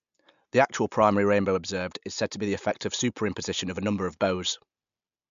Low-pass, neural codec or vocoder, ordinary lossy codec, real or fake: 7.2 kHz; none; MP3, 64 kbps; real